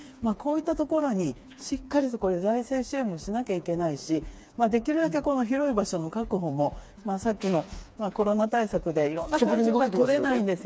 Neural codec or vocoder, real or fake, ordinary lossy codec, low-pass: codec, 16 kHz, 4 kbps, FreqCodec, smaller model; fake; none; none